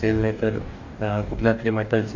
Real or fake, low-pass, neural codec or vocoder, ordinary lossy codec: fake; 7.2 kHz; codec, 44.1 kHz, 2.6 kbps, DAC; none